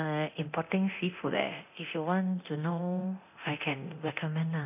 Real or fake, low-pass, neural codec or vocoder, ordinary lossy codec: fake; 3.6 kHz; codec, 24 kHz, 0.9 kbps, DualCodec; none